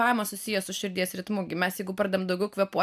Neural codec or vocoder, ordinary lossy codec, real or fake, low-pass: none; AAC, 96 kbps; real; 14.4 kHz